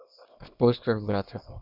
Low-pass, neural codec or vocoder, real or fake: 5.4 kHz; codec, 24 kHz, 0.9 kbps, WavTokenizer, small release; fake